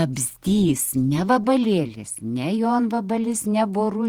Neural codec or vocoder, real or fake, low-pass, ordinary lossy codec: vocoder, 48 kHz, 128 mel bands, Vocos; fake; 19.8 kHz; Opus, 24 kbps